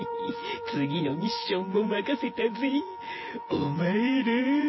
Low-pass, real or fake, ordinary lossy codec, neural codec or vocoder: 7.2 kHz; fake; MP3, 24 kbps; vocoder, 24 kHz, 100 mel bands, Vocos